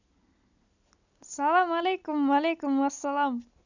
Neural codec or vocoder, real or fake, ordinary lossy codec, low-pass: none; real; none; 7.2 kHz